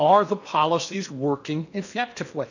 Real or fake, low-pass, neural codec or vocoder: fake; 7.2 kHz; codec, 16 kHz in and 24 kHz out, 0.8 kbps, FocalCodec, streaming, 65536 codes